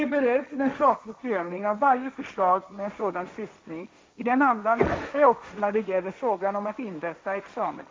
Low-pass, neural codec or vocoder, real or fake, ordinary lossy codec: none; codec, 16 kHz, 1.1 kbps, Voila-Tokenizer; fake; none